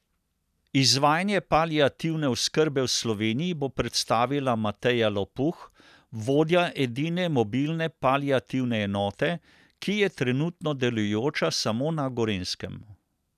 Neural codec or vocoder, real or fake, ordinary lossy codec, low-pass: none; real; none; 14.4 kHz